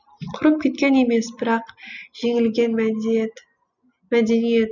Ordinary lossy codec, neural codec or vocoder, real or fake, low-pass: none; none; real; 7.2 kHz